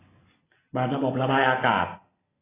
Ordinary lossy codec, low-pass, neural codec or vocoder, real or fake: AAC, 16 kbps; 3.6 kHz; none; real